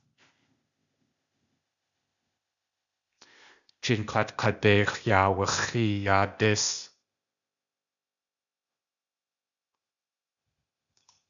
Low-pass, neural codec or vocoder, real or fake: 7.2 kHz; codec, 16 kHz, 0.8 kbps, ZipCodec; fake